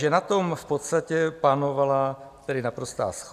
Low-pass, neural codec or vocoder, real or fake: 14.4 kHz; none; real